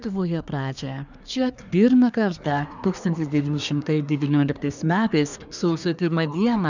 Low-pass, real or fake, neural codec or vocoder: 7.2 kHz; fake; codec, 24 kHz, 1 kbps, SNAC